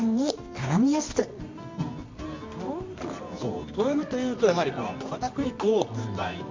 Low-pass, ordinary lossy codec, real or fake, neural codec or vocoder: 7.2 kHz; MP3, 48 kbps; fake; codec, 24 kHz, 0.9 kbps, WavTokenizer, medium music audio release